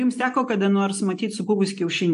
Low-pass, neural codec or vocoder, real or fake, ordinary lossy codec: 10.8 kHz; none; real; AAC, 64 kbps